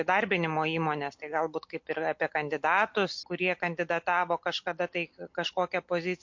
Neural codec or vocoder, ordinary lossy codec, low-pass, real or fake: none; MP3, 48 kbps; 7.2 kHz; real